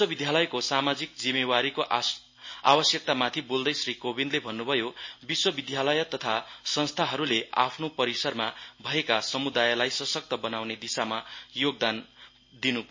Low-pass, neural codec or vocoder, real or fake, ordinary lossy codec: 7.2 kHz; none; real; MP3, 32 kbps